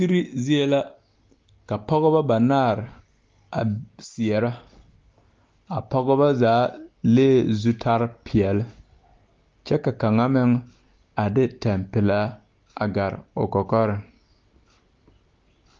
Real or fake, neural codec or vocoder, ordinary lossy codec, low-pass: real; none; Opus, 32 kbps; 7.2 kHz